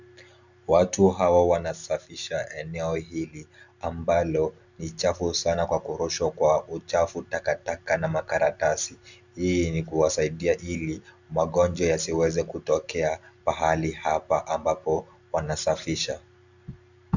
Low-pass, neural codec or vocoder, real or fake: 7.2 kHz; none; real